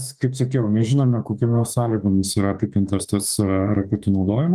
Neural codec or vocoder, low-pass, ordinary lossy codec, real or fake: autoencoder, 48 kHz, 32 numbers a frame, DAC-VAE, trained on Japanese speech; 14.4 kHz; Opus, 32 kbps; fake